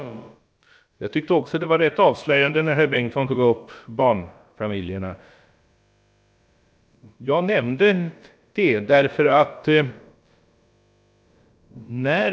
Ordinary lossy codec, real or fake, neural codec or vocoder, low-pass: none; fake; codec, 16 kHz, about 1 kbps, DyCAST, with the encoder's durations; none